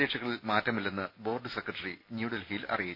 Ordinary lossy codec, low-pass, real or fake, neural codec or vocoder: MP3, 32 kbps; 5.4 kHz; real; none